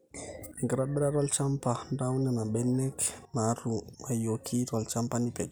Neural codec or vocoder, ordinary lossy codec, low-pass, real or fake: none; none; none; real